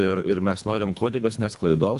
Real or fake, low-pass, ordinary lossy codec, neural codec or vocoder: fake; 10.8 kHz; MP3, 96 kbps; codec, 24 kHz, 1.5 kbps, HILCodec